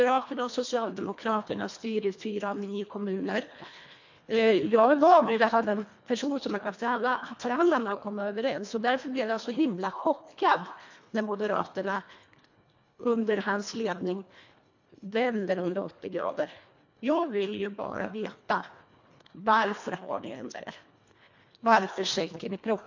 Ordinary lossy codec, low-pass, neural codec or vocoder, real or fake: MP3, 48 kbps; 7.2 kHz; codec, 24 kHz, 1.5 kbps, HILCodec; fake